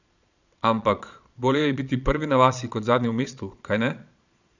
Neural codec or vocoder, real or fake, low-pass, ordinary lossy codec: vocoder, 22.05 kHz, 80 mel bands, Vocos; fake; 7.2 kHz; none